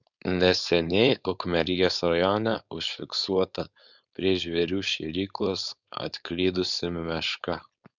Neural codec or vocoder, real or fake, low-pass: codec, 16 kHz, 4.8 kbps, FACodec; fake; 7.2 kHz